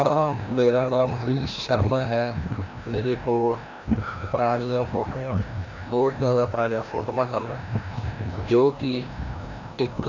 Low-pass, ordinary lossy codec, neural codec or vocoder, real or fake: 7.2 kHz; none; codec, 16 kHz, 1 kbps, FreqCodec, larger model; fake